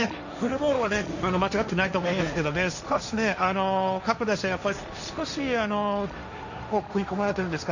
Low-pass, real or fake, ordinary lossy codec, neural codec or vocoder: 7.2 kHz; fake; none; codec, 16 kHz, 1.1 kbps, Voila-Tokenizer